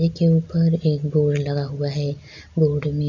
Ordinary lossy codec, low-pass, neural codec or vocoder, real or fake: none; 7.2 kHz; none; real